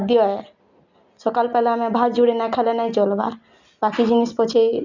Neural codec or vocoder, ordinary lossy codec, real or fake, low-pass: none; none; real; 7.2 kHz